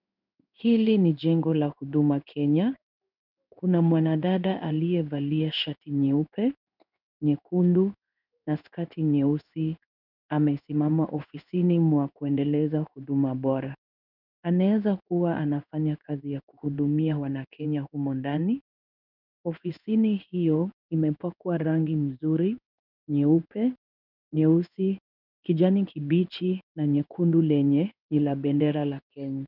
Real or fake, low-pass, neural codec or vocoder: fake; 5.4 kHz; codec, 16 kHz in and 24 kHz out, 1 kbps, XY-Tokenizer